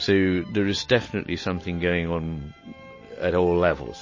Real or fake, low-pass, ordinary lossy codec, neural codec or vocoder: real; 7.2 kHz; MP3, 32 kbps; none